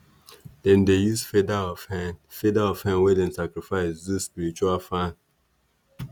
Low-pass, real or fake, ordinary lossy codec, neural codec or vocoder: none; real; none; none